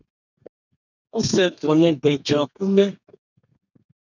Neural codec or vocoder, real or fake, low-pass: codec, 32 kHz, 1.9 kbps, SNAC; fake; 7.2 kHz